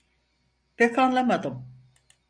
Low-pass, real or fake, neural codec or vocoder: 9.9 kHz; real; none